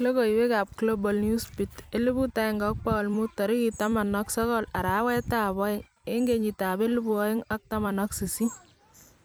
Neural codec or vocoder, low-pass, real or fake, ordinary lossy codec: vocoder, 44.1 kHz, 128 mel bands every 256 samples, BigVGAN v2; none; fake; none